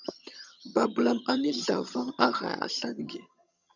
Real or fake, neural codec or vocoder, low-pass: fake; vocoder, 22.05 kHz, 80 mel bands, HiFi-GAN; 7.2 kHz